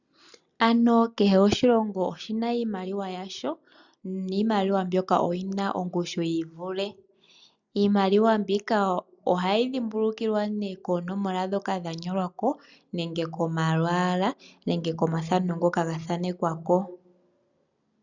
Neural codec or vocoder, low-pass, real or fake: none; 7.2 kHz; real